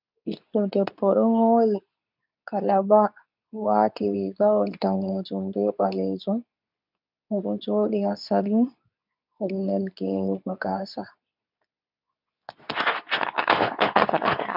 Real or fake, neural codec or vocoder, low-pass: fake; codec, 24 kHz, 0.9 kbps, WavTokenizer, medium speech release version 2; 5.4 kHz